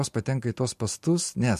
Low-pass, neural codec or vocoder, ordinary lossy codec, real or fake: 14.4 kHz; none; MP3, 64 kbps; real